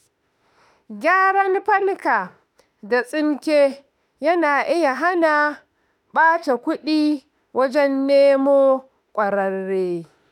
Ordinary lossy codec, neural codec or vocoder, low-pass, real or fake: none; autoencoder, 48 kHz, 32 numbers a frame, DAC-VAE, trained on Japanese speech; 19.8 kHz; fake